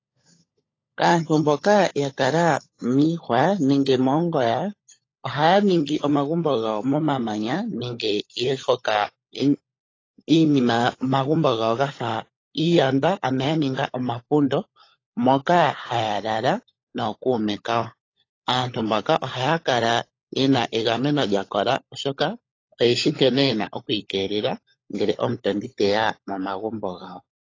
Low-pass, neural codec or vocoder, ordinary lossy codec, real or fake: 7.2 kHz; codec, 16 kHz, 16 kbps, FunCodec, trained on LibriTTS, 50 frames a second; AAC, 32 kbps; fake